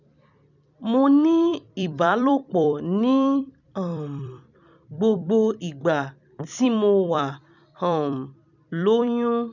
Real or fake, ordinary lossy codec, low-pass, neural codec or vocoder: fake; none; 7.2 kHz; vocoder, 44.1 kHz, 128 mel bands every 256 samples, BigVGAN v2